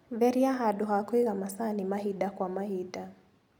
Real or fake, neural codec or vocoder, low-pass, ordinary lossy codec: real; none; 19.8 kHz; none